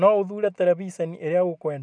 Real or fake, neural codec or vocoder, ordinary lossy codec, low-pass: real; none; none; 9.9 kHz